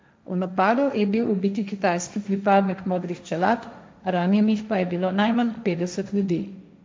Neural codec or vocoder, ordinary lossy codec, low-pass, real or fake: codec, 16 kHz, 1.1 kbps, Voila-Tokenizer; none; none; fake